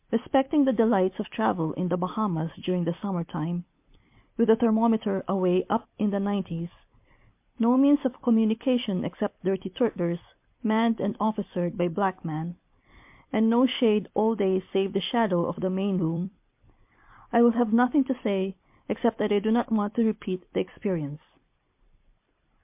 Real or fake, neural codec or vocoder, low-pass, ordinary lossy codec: fake; vocoder, 44.1 kHz, 128 mel bands every 256 samples, BigVGAN v2; 3.6 kHz; MP3, 32 kbps